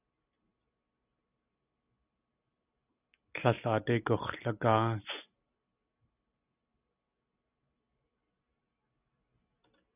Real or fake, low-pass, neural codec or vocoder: real; 3.6 kHz; none